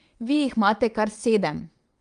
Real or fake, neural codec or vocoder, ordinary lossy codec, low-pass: fake; vocoder, 22.05 kHz, 80 mel bands, WaveNeXt; Opus, 32 kbps; 9.9 kHz